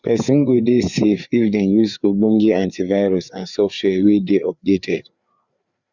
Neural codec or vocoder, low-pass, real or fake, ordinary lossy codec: codec, 16 kHz, 4 kbps, FreqCodec, larger model; 7.2 kHz; fake; Opus, 64 kbps